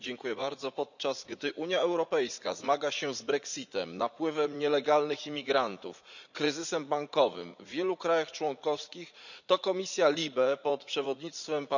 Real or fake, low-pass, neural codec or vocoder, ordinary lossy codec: fake; 7.2 kHz; vocoder, 44.1 kHz, 80 mel bands, Vocos; none